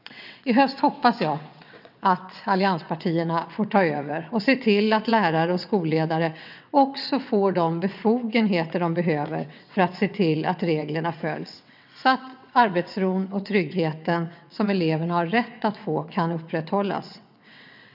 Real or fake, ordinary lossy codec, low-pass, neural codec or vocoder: fake; none; 5.4 kHz; vocoder, 22.05 kHz, 80 mel bands, WaveNeXt